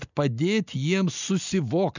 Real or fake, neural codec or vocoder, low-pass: real; none; 7.2 kHz